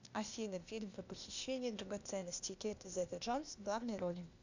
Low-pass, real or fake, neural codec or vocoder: 7.2 kHz; fake; codec, 16 kHz, 0.8 kbps, ZipCodec